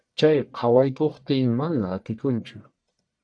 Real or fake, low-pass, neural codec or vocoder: fake; 9.9 kHz; codec, 44.1 kHz, 1.7 kbps, Pupu-Codec